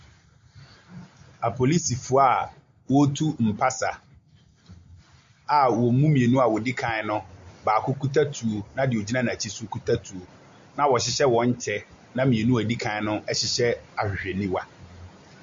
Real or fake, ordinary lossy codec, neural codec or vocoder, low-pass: real; MP3, 48 kbps; none; 7.2 kHz